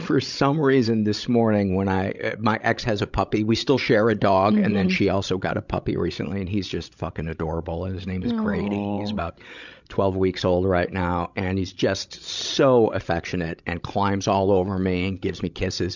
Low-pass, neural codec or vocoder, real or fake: 7.2 kHz; codec, 16 kHz, 16 kbps, FreqCodec, larger model; fake